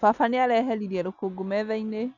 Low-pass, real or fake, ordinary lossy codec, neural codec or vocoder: 7.2 kHz; real; none; none